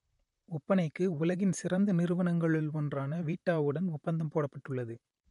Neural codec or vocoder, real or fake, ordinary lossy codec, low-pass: vocoder, 44.1 kHz, 128 mel bands, Pupu-Vocoder; fake; MP3, 48 kbps; 14.4 kHz